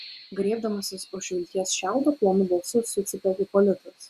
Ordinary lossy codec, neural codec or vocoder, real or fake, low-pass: Opus, 64 kbps; none; real; 14.4 kHz